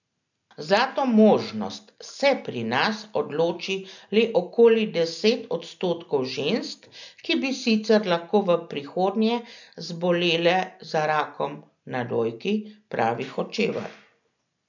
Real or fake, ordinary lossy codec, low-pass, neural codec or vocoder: real; none; 7.2 kHz; none